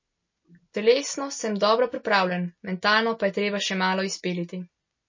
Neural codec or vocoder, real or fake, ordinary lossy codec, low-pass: none; real; MP3, 32 kbps; 7.2 kHz